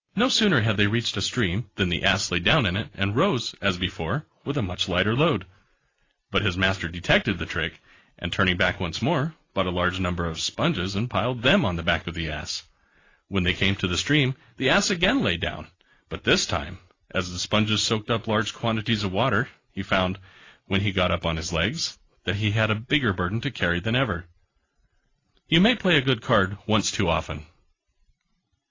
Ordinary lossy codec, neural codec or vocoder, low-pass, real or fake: AAC, 32 kbps; none; 7.2 kHz; real